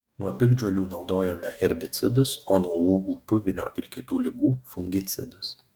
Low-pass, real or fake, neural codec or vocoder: 19.8 kHz; fake; codec, 44.1 kHz, 2.6 kbps, DAC